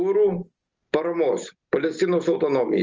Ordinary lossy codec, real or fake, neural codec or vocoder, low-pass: Opus, 24 kbps; real; none; 7.2 kHz